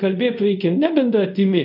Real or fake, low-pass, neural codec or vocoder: fake; 5.4 kHz; codec, 24 kHz, 0.5 kbps, DualCodec